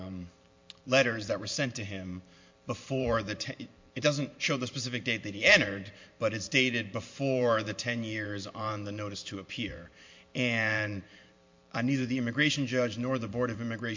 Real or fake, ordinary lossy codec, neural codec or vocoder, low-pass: fake; MP3, 48 kbps; vocoder, 44.1 kHz, 128 mel bands every 512 samples, BigVGAN v2; 7.2 kHz